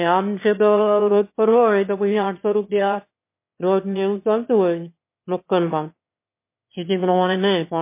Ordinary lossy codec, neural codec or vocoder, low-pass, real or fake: MP3, 24 kbps; autoencoder, 22.05 kHz, a latent of 192 numbers a frame, VITS, trained on one speaker; 3.6 kHz; fake